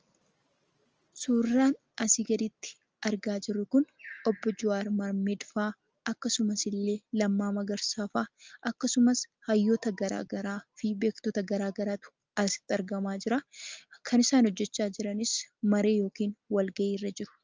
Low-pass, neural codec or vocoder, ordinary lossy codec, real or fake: 7.2 kHz; none; Opus, 24 kbps; real